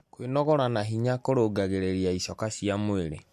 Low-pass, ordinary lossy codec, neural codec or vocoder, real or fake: 14.4 kHz; MP3, 64 kbps; none; real